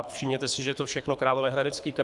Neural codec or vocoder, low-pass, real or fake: codec, 24 kHz, 3 kbps, HILCodec; 10.8 kHz; fake